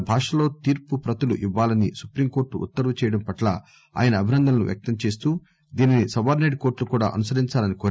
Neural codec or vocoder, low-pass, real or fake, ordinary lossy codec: none; none; real; none